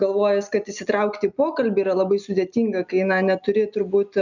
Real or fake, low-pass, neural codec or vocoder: real; 7.2 kHz; none